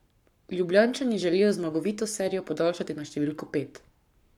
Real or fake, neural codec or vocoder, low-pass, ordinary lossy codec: fake; codec, 44.1 kHz, 7.8 kbps, Pupu-Codec; 19.8 kHz; none